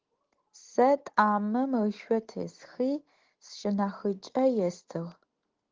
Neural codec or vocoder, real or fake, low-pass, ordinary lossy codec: none; real; 7.2 kHz; Opus, 16 kbps